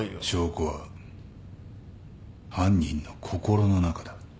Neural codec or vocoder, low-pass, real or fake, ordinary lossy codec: none; none; real; none